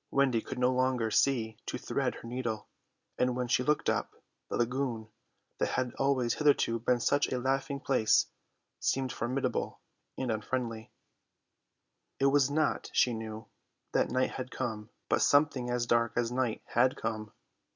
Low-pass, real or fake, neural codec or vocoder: 7.2 kHz; real; none